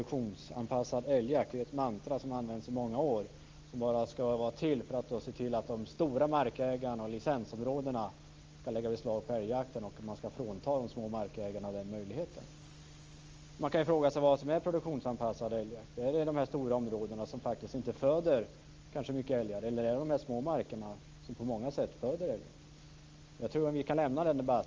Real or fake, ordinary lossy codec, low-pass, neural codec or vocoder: real; Opus, 32 kbps; 7.2 kHz; none